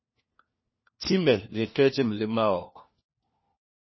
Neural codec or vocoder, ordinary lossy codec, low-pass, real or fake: codec, 16 kHz, 1 kbps, FunCodec, trained on LibriTTS, 50 frames a second; MP3, 24 kbps; 7.2 kHz; fake